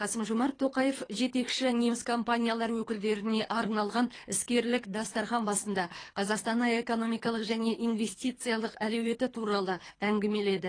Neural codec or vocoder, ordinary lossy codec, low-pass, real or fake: codec, 24 kHz, 3 kbps, HILCodec; AAC, 32 kbps; 9.9 kHz; fake